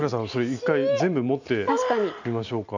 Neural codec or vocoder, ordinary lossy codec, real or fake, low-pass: none; none; real; 7.2 kHz